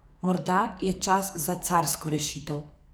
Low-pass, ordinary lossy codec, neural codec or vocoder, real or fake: none; none; codec, 44.1 kHz, 2.6 kbps, SNAC; fake